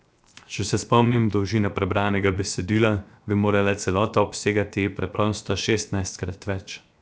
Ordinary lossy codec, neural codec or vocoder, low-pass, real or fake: none; codec, 16 kHz, 0.7 kbps, FocalCodec; none; fake